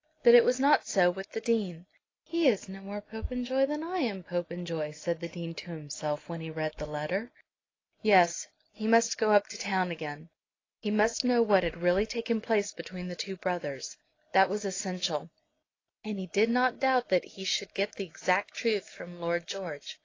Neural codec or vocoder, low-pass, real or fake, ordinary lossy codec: none; 7.2 kHz; real; AAC, 32 kbps